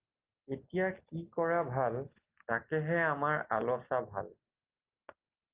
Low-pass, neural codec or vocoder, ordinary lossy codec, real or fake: 3.6 kHz; none; Opus, 32 kbps; real